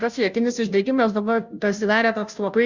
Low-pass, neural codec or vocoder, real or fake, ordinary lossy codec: 7.2 kHz; codec, 16 kHz, 0.5 kbps, FunCodec, trained on Chinese and English, 25 frames a second; fake; Opus, 64 kbps